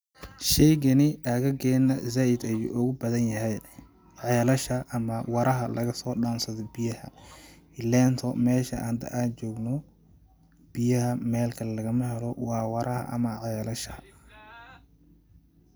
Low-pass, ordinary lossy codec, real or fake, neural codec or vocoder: none; none; real; none